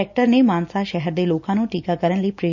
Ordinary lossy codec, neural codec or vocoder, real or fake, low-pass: none; none; real; 7.2 kHz